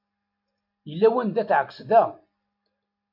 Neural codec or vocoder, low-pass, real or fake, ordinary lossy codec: none; 5.4 kHz; real; MP3, 48 kbps